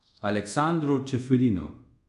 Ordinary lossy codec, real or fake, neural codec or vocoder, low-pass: none; fake; codec, 24 kHz, 0.5 kbps, DualCodec; 10.8 kHz